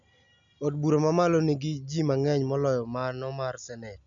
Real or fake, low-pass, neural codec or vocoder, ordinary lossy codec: real; 7.2 kHz; none; none